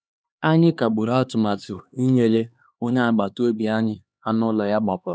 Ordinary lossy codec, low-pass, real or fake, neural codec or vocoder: none; none; fake; codec, 16 kHz, 2 kbps, X-Codec, HuBERT features, trained on LibriSpeech